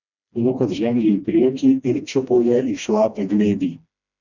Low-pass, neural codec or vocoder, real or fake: 7.2 kHz; codec, 16 kHz, 1 kbps, FreqCodec, smaller model; fake